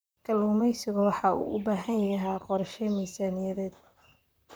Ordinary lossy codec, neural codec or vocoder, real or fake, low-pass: none; vocoder, 44.1 kHz, 128 mel bands, Pupu-Vocoder; fake; none